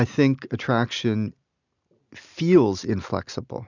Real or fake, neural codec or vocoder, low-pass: real; none; 7.2 kHz